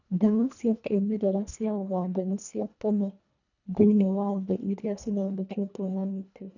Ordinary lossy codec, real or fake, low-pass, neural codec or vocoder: none; fake; 7.2 kHz; codec, 24 kHz, 1.5 kbps, HILCodec